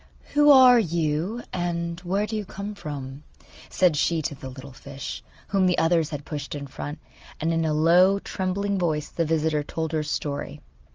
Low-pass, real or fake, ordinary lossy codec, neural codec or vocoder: 7.2 kHz; real; Opus, 24 kbps; none